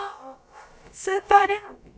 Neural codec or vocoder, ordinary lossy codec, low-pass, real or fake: codec, 16 kHz, about 1 kbps, DyCAST, with the encoder's durations; none; none; fake